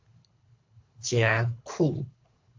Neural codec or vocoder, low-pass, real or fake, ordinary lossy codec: codec, 24 kHz, 3 kbps, HILCodec; 7.2 kHz; fake; MP3, 48 kbps